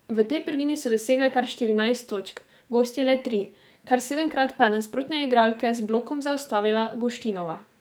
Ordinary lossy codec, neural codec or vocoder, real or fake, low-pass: none; codec, 44.1 kHz, 2.6 kbps, SNAC; fake; none